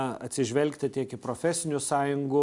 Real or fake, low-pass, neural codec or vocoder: real; 10.8 kHz; none